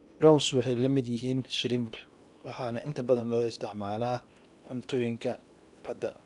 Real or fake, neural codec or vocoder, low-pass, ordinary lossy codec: fake; codec, 16 kHz in and 24 kHz out, 0.8 kbps, FocalCodec, streaming, 65536 codes; 10.8 kHz; none